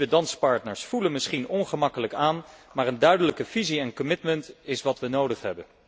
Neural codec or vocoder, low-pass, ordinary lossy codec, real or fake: none; none; none; real